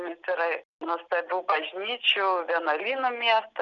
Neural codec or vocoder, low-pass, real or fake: none; 7.2 kHz; real